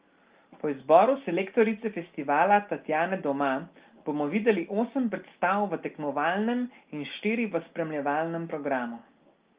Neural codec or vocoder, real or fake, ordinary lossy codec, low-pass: none; real; Opus, 32 kbps; 3.6 kHz